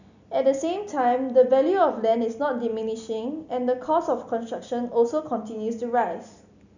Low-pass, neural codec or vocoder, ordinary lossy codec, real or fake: 7.2 kHz; none; none; real